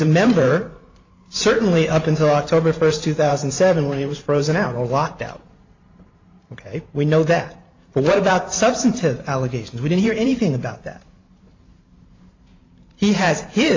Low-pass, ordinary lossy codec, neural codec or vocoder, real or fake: 7.2 kHz; AAC, 48 kbps; vocoder, 44.1 kHz, 128 mel bands every 512 samples, BigVGAN v2; fake